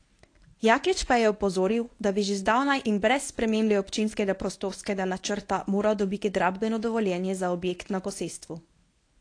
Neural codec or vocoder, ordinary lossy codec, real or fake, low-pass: codec, 24 kHz, 0.9 kbps, WavTokenizer, medium speech release version 1; AAC, 48 kbps; fake; 9.9 kHz